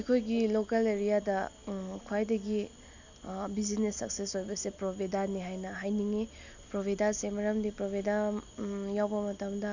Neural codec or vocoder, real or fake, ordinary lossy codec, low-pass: none; real; none; 7.2 kHz